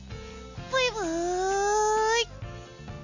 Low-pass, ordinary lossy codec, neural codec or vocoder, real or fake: 7.2 kHz; none; none; real